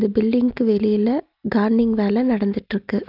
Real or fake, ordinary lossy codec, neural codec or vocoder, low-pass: real; Opus, 32 kbps; none; 5.4 kHz